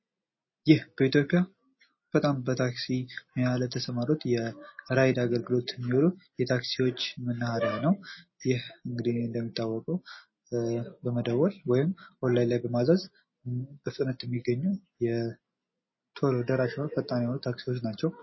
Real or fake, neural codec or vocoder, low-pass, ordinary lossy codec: real; none; 7.2 kHz; MP3, 24 kbps